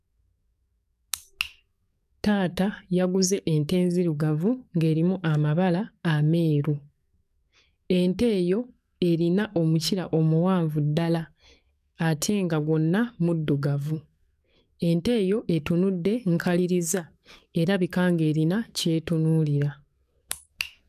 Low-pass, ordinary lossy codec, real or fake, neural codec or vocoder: 14.4 kHz; none; fake; codec, 44.1 kHz, 7.8 kbps, DAC